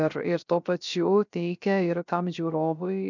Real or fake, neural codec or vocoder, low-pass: fake; codec, 16 kHz, 0.3 kbps, FocalCodec; 7.2 kHz